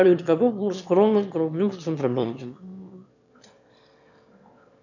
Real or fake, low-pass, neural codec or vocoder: fake; 7.2 kHz; autoencoder, 22.05 kHz, a latent of 192 numbers a frame, VITS, trained on one speaker